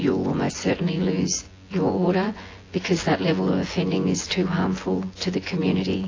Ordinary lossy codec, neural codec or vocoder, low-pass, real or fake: AAC, 32 kbps; vocoder, 24 kHz, 100 mel bands, Vocos; 7.2 kHz; fake